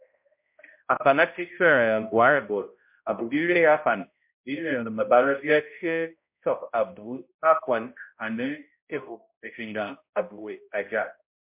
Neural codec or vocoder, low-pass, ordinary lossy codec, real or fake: codec, 16 kHz, 0.5 kbps, X-Codec, HuBERT features, trained on balanced general audio; 3.6 kHz; MP3, 32 kbps; fake